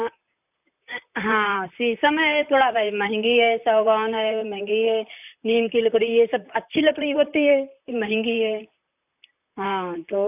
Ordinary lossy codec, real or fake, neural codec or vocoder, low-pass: none; fake; vocoder, 44.1 kHz, 128 mel bands, Pupu-Vocoder; 3.6 kHz